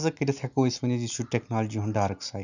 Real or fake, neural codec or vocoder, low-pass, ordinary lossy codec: real; none; 7.2 kHz; none